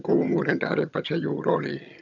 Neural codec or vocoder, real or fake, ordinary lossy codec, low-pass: vocoder, 22.05 kHz, 80 mel bands, HiFi-GAN; fake; none; 7.2 kHz